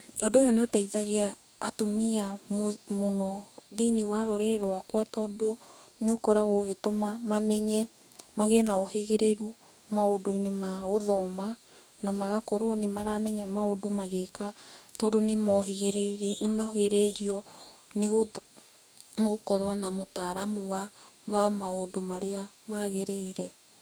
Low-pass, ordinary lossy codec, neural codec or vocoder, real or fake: none; none; codec, 44.1 kHz, 2.6 kbps, DAC; fake